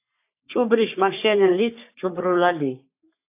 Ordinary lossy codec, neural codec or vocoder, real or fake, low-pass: AAC, 32 kbps; codec, 44.1 kHz, 3.4 kbps, Pupu-Codec; fake; 3.6 kHz